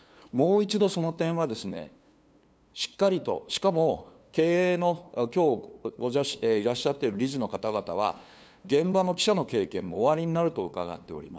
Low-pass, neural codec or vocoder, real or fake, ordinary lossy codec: none; codec, 16 kHz, 2 kbps, FunCodec, trained on LibriTTS, 25 frames a second; fake; none